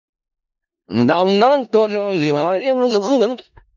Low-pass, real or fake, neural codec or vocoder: 7.2 kHz; fake; codec, 16 kHz in and 24 kHz out, 0.4 kbps, LongCat-Audio-Codec, four codebook decoder